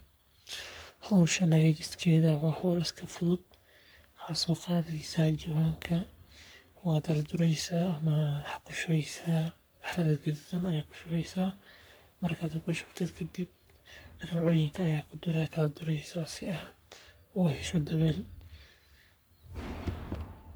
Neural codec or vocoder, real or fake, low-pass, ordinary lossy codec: codec, 44.1 kHz, 3.4 kbps, Pupu-Codec; fake; none; none